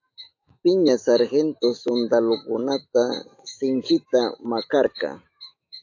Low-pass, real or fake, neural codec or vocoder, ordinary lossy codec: 7.2 kHz; fake; autoencoder, 48 kHz, 128 numbers a frame, DAC-VAE, trained on Japanese speech; AAC, 48 kbps